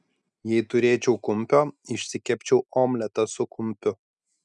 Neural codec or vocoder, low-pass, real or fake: none; 10.8 kHz; real